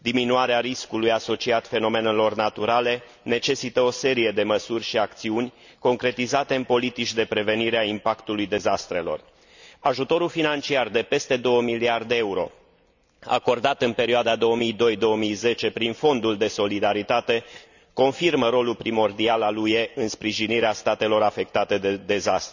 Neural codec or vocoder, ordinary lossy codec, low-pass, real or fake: none; none; 7.2 kHz; real